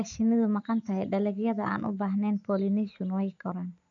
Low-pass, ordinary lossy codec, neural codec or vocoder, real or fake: 7.2 kHz; none; none; real